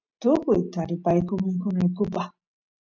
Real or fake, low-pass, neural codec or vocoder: real; 7.2 kHz; none